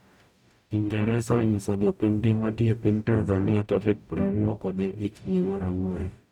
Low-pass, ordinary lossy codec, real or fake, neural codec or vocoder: 19.8 kHz; none; fake; codec, 44.1 kHz, 0.9 kbps, DAC